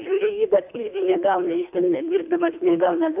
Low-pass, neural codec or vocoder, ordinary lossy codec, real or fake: 3.6 kHz; codec, 24 kHz, 1.5 kbps, HILCodec; AAC, 32 kbps; fake